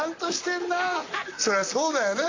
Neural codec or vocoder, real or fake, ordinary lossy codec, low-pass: vocoder, 44.1 kHz, 128 mel bands, Pupu-Vocoder; fake; AAC, 32 kbps; 7.2 kHz